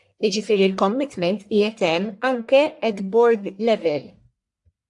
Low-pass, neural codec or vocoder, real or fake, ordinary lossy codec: 10.8 kHz; codec, 44.1 kHz, 1.7 kbps, Pupu-Codec; fake; AAC, 64 kbps